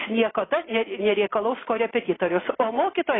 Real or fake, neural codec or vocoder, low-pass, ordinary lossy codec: real; none; 7.2 kHz; AAC, 16 kbps